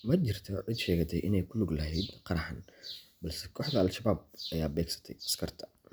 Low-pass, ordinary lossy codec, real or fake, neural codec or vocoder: none; none; real; none